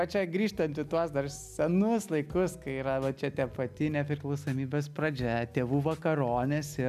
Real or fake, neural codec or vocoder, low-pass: real; none; 14.4 kHz